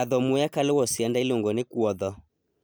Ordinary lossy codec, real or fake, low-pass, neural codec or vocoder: none; real; none; none